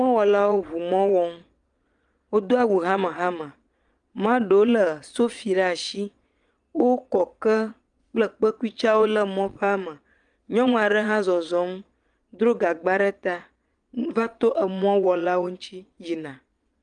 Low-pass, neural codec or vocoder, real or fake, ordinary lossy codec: 9.9 kHz; vocoder, 22.05 kHz, 80 mel bands, Vocos; fake; Opus, 32 kbps